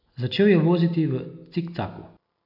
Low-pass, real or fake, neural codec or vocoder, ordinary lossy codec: 5.4 kHz; real; none; none